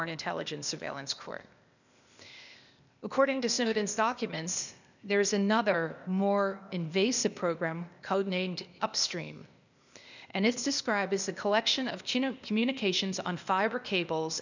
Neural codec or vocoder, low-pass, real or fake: codec, 16 kHz, 0.8 kbps, ZipCodec; 7.2 kHz; fake